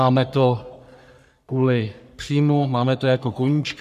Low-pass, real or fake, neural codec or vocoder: 14.4 kHz; fake; codec, 44.1 kHz, 3.4 kbps, Pupu-Codec